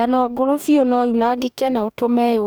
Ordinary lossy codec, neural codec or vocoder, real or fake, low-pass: none; codec, 44.1 kHz, 2.6 kbps, DAC; fake; none